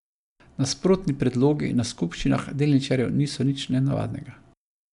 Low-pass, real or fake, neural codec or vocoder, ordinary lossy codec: 10.8 kHz; real; none; none